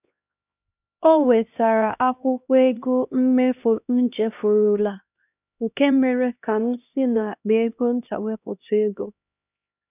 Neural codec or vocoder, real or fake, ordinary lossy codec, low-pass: codec, 16 kHz, 1 kbps, X-Codec, HuBERT features, trained on LibriSpeech; fake; none; 3.6 kHz